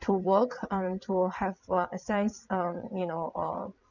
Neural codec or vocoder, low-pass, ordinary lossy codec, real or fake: vocoder, 22.05 kHz, 80 mel bands, WaveNeXt; 7.2 kHz; none; fake